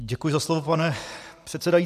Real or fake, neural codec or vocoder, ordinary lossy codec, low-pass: real; none; MP3, 96 kbps; 14.4 kHz